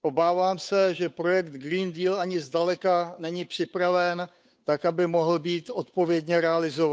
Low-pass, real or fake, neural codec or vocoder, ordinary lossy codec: none; fake; codec, 16 kHz, 8 kbps, FunCodec, trained on Chinese and English, 25 frames a second; none